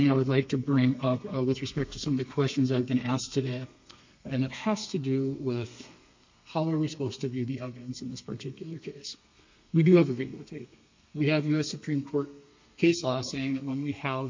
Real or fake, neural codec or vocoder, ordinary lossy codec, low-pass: fake; codec, 32 kHz, 1.9 kbps, SNAC; MP3, 48 kbps; 7.2 kHz